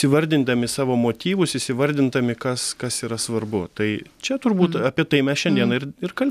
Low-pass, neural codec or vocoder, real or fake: 14.4 kHz; none; real